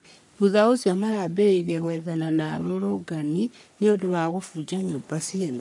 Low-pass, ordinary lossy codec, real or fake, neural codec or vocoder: 10.8 kHz; none; fake; codec, 44.1 kHz, 3.4 kbps, Pupu-Codec